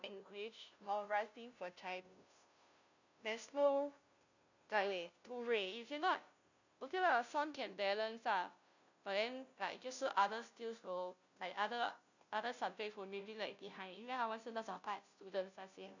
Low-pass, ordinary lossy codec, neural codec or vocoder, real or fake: 7.2 kHz; none; codec, 16 kHz, 0.5 kbps, FunCodec, trained on Chinese and English, 25 frames a second; fake